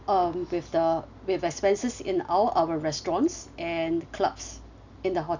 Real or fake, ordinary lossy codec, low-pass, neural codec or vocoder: real; none; 7.2 kHz; none